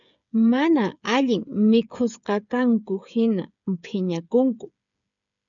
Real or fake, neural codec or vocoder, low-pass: fake; codec, 16 kHz, 8 kbps, FreqCodec, smaller model; 7.2 kHz